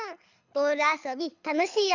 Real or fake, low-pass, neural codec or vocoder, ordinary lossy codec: fake; 7.2 kHz; codec, 24 kHz, 6 kbps, HILCodec; none